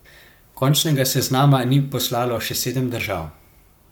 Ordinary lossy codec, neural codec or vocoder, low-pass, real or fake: none; codec, 44.1 kHz, 7.8 kbps, Pupu-Codec; none; fake